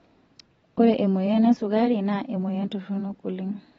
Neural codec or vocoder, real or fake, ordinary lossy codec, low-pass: vocoder, 44.1 kHz, 128 mel bands every 512 samples, BigVGAN v2; fake; AAC, 24 kbps; 19.8 kHz